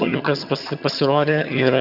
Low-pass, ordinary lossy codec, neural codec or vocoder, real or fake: 5.4 kHz; Opus, 64 kbps; vocoder, 22.05 kHz, 80 mel bands, HiFi-GAN; fake